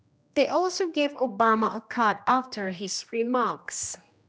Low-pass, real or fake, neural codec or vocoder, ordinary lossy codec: none; fake; codec, 16 kHz, 1 kbps, X-Codec, HuBERT features, trained on general audio; none